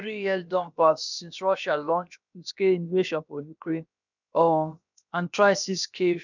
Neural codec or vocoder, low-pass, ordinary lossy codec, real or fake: codec, 16 kHz, about 1 kbps, DyCAST, with the encoder's durations; 7.2 kHz; none; fake